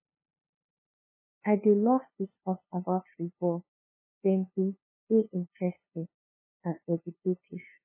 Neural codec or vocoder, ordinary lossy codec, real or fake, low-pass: codec, 16 kHz, 2 kbps, FunCodec, trained on LibriTTS, 25 frames a second; MP3, 16 kbps; fake; 3.6 kHz